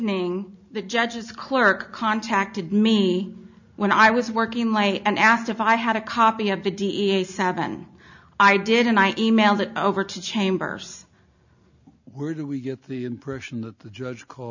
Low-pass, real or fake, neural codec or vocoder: 7.2 kHz; real; none